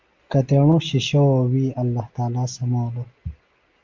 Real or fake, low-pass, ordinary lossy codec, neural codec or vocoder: real; 7.2 kHz; Opus, 32 kbps; none